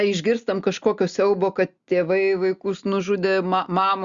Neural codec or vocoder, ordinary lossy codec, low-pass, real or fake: none; Opus, 64 kbps; 7.2 kHz; real